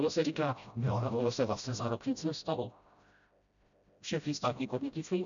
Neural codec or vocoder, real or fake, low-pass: codec, 16 kHz, 0.5 kbps, FreqCodec, smaller model; fake; 7.2 kHz